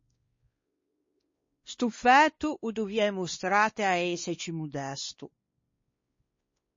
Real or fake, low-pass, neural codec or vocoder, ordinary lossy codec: fake; 7.2 kHz; codec, 16 kHz, 2 kbps, X-Codec, WavLM features, trained on Multilingual LibriSpeech; MP3, 32 kbps